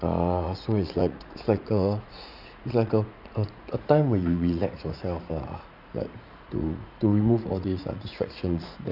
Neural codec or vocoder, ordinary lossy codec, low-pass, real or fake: vocoder, 44.1 kHz, 80 mel bands, Vocos; none; 5.4 kHz; fake